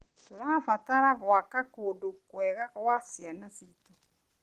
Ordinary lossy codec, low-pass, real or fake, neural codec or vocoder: Opus, 16 kbps; 14.4 kHz; real; none